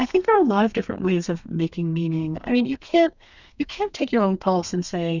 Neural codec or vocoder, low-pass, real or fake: codec, 32 kHz, 1.9 kbps, SNAC; 7.2 kHz; fake